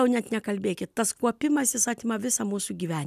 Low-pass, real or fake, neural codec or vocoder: 14.4 kHz; real; none